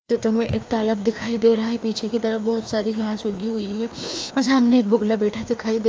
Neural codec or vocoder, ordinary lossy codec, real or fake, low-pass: codec, 16 kHz, 2 kbps, FreqCodec, larger model; none; fake; none